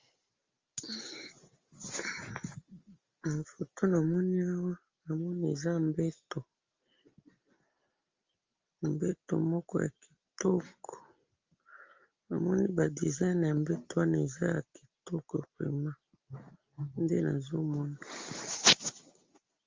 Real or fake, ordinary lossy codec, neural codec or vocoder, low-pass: real; Opus, 32 kbps; none; 7.2 kHz